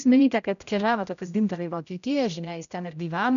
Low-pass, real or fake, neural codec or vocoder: 7.2 kHz; fake; codec, 16 kHz, 0.5 kbps, X-Codec, HuBERT features, trained on general audio